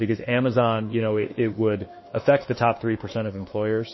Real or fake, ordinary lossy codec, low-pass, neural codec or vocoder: fake; MP3, 24 kbps; 7.2 kHz; autoencoder, 48 kHz, 32 numbers a frame, DAC-VAE, trained on Japanese speech